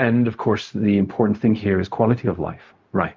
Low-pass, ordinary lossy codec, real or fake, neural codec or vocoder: 7.2 kHz; Opus, 32 kbps; fake; codec, 16 kHz, 0.4 kbps, LongCat-Audio-Codec